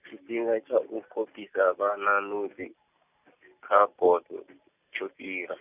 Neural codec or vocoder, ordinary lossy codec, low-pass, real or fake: none; none; 3.6 kHz; real